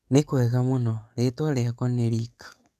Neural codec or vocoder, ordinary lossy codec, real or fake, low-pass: codec, 44.1 kHz, 7.8 kbps, DAC; none; fake; 14.4 kHz